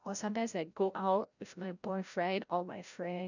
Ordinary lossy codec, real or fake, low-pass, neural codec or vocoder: none; fake; 7.2 kHz; codec, 16 kHz, 0.5 kbps, FreqCodec, larger model